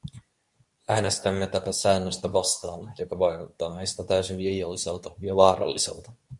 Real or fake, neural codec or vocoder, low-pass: fake; codec, 24 kHz, 0.9 kbps, WavTokenizer, medium speech release version 2; 10.8 kHz